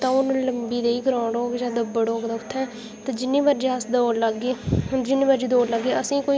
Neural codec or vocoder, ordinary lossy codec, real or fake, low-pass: none; none; real; none